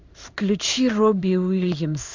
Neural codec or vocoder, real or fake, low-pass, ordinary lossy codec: codec, 16 kHz in and 24 kHz out, 1 kbps, XY-Tokenizer; fake; 7.2 kHz; MP3, 64 kbps